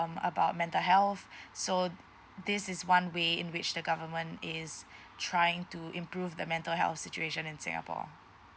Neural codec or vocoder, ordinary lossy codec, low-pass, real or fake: none; none; none; real